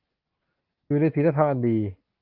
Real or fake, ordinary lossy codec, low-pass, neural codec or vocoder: real; Opus, 32 kbps; 5.4 kHz; none